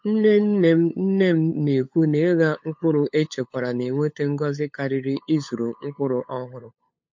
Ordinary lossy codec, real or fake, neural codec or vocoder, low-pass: MP3, 48 kbps; fake; codec, 16 kHz, 8 kbps, FunCodec, trained on LibriTTS, 25 frames a second; 7.2 kHz